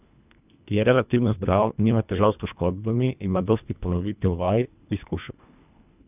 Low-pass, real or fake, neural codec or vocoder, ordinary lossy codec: 3.6 kHz; fake; codec, 24 kHz, 1.5 kbps, HILCodec; none